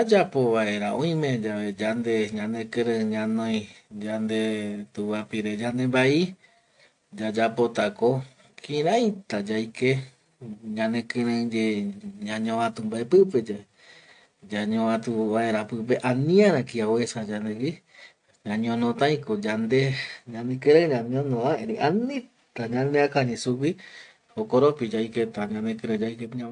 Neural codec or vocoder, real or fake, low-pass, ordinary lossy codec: none; real; 9.9 kHz; AAC, 64 kbps